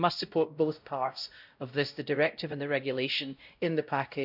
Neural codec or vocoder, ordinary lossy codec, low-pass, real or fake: codec, 16 kHz, 0.5 kbps, X-Codec, WavLM features, trained on Multilingual LibriSpeech; none; 5.4 kHz; fake